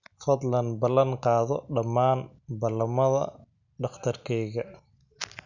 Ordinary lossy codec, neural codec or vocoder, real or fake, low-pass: none; none; real; 7.2 kHz